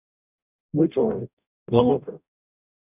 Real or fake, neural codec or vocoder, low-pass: fake; codec, 44.1 kHz, 0.9 kbps, DAC; 3.6 kHz